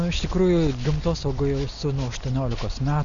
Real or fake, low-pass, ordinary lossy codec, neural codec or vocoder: real; 7.2 kHz; MP3, 96 kbps; none